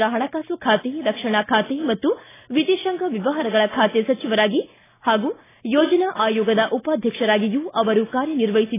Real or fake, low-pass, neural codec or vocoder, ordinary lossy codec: real; 3.6 kHz; none; AAC, 16 kbps